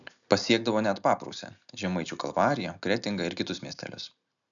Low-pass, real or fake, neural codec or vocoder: 7.2 kHz; real; none